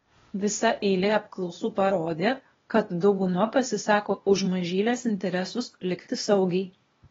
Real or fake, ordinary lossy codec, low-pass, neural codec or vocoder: fake; AAC, 24 kbps; 7.2 kHz; codec, 16 kHz, 0.8 kbps, ZipCodec